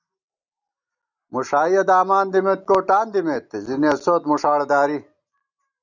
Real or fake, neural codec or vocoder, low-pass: real; none; 7.2 kHz